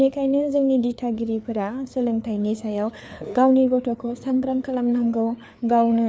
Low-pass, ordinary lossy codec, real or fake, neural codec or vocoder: none; none; fake; codec, 16 kHz, 4 kbps, FreqCodec, larger model